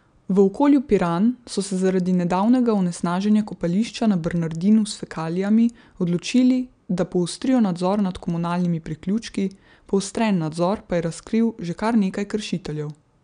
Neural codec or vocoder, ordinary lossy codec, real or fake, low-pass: none; none; real; 9.9 kHz